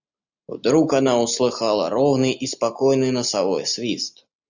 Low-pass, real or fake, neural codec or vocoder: 7.2 kHz; real; none